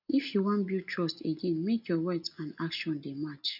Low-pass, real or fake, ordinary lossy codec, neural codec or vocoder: 5.4 kHz; real; none; none